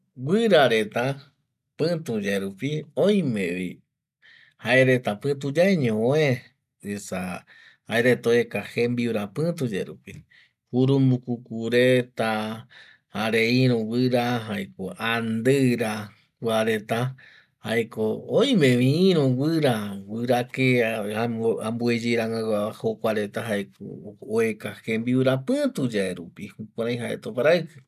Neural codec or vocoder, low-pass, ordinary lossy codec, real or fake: none; 14.4 kHz; none; real